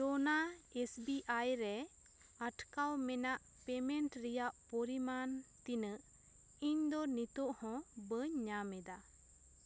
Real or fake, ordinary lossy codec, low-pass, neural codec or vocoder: real; none; none; none